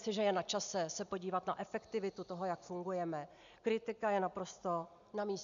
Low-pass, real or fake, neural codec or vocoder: 7.2 kHz; real; none